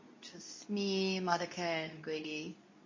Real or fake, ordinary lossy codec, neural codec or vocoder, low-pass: fake; MP3, 32 kbps; codec, 24 kHz, 0.9 kbps, WavTokenizer, medium speech release version 2; 7.2 kHz